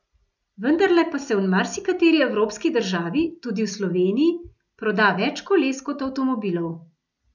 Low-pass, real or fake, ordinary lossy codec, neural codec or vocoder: 7.2 kHz; real; none; none